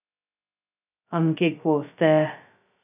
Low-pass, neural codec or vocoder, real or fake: 3.6 kHz; codec, 16 kHz, 0.2 kbps, FocalCodec; fake